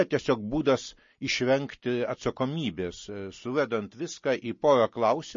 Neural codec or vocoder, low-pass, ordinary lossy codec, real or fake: none; 7.2 kHz; MP3, 32 kbps; real